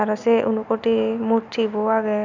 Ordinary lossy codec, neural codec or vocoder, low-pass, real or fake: none; none; 7.2 kHz; real